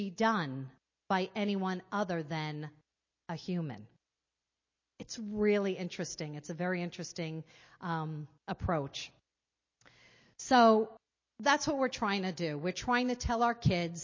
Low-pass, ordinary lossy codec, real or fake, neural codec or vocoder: 7.2 kHz; MP3, 32 kbps; real; none